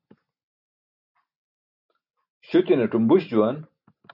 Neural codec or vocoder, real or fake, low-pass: none; real; 5.4 kHz